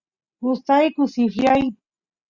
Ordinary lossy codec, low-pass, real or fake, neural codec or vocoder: Opus, 64 kbps; 7.2 kHz; real; none